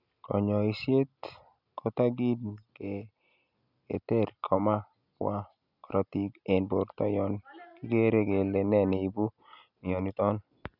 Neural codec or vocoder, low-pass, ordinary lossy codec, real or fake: none; 5.4 kHz; none; real